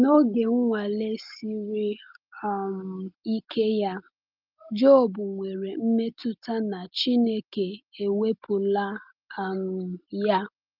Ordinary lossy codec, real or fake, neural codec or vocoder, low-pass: Opus, 32 kbps; real; none; 5.4 kHz